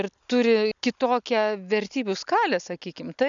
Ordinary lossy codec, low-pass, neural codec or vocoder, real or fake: MP3, 96 kbps; 7.2 kHz; none; real